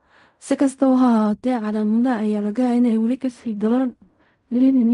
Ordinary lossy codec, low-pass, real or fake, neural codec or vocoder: MP3, 96 kbps; 10.8 kHz; fake; codec, 16 kHz in and 24 kHz out, 0.4 kbps, LongCat-Audio-Codec, fine tuned four codebook decoder